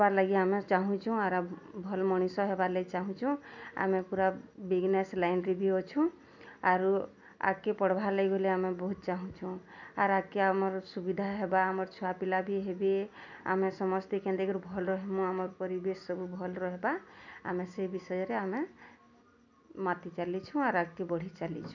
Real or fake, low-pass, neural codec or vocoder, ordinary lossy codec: real; 7.2 kHz; none; none